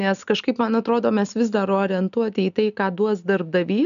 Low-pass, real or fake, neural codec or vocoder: 7.2 kHz; real; none